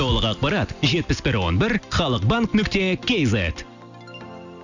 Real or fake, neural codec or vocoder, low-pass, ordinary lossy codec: real; none; 7.2 kHz; none